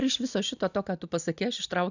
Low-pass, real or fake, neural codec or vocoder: 7.2 kHz; real; none